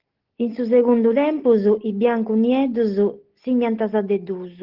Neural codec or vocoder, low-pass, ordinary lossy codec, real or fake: none; 5.4 kHz; Opus, 16 kbps; real